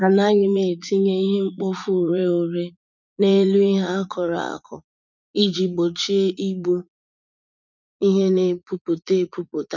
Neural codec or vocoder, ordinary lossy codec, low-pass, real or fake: vocoder, 44.1 kHz, 80 mel bands, Vocos; none; 7.2 kHz; fake